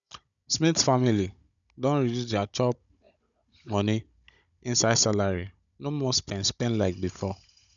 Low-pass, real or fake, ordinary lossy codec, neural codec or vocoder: 7.2 kHz; fake; none; codec, 16 kHz, 16 kbps, FunCodec, trained on Chinese and English, 50 frames a second